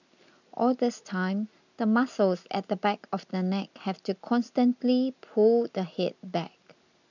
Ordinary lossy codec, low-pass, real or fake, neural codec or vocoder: none; 7.2 kHz; real; none